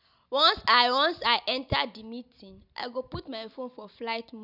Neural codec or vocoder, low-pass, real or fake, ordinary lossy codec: none; 5.4 kHz; real; none